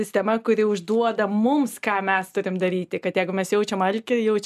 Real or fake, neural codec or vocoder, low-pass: real; none; 14.4 kHz